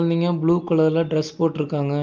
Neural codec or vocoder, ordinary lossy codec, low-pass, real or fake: none; Opus, 24 kbps; 7.2 kHz; real